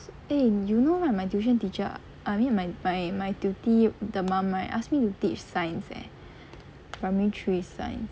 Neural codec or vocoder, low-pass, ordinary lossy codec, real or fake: none; none; none; real